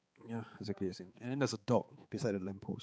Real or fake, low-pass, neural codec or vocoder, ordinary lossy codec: fake; none; codec, 16 kHz, 4 kbps, X-Codec, HuBERT features, trained on balanced general audio; none